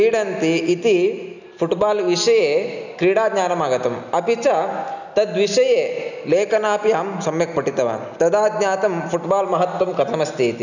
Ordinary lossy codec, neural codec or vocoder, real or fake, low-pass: none; none; real; 7.2 kHz